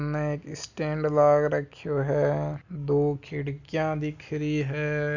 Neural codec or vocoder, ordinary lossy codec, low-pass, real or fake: none; none; 7.2 kHz; real